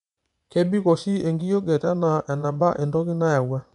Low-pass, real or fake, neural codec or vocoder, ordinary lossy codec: 10.8 kHz; fake; vocoder, 24 kHz, 100 mel bands, Vocos; none